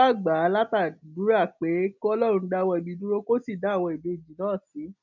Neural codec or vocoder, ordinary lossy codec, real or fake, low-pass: none; none; real; 7.2 kHz